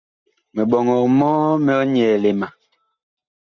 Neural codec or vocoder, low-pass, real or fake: none; 7.2 kHz; real